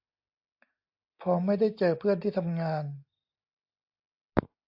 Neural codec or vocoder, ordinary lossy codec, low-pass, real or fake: none; AAC, 48 kbps; 5.4 kHz; real